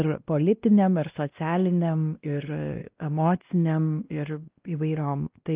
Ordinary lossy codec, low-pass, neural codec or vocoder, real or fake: Opus, 32 kbps; 3.6 kHz; codec, 16 kHz, 1 kbps, X-Codec, WavLM features, trained on Multilingual LibriSpeech; fake